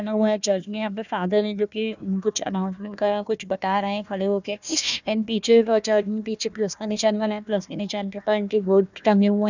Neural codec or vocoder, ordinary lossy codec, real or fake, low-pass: codec, 16 kHz, 1 kbps, FunCodec, trained on Chinese and English, 50 frames a second; none; fake; 7.2 kHz